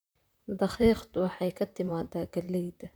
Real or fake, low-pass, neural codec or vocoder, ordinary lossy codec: fake; none; vocoder, 44.1 kHz, 128 mel bands, Pupu-Vocoder; none